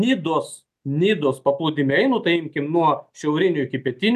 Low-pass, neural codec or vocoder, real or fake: 14.4 kHz; none; real